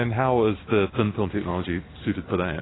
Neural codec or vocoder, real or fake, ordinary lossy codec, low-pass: none; real; AAC, 16 kbps; 7.2 kHz